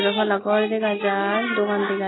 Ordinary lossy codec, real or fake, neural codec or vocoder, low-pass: AAC, 16 kbps; real; none; 7.2 kHz